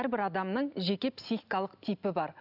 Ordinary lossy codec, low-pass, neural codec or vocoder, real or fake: none; 5.4 kHz; none; real